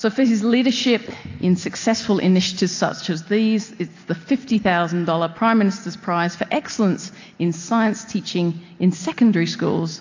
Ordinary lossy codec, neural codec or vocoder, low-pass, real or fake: AAC, 48 kbps; none; 7.2 kHz; real